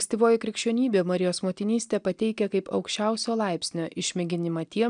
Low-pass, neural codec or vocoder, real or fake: 9.9 kHz; none; real